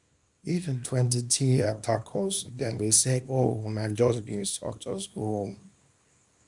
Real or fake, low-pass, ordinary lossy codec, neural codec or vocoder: fake; 10.8 kHz; none; codec, 24 kHz, 0.9 kbps, WavTokenizer, small release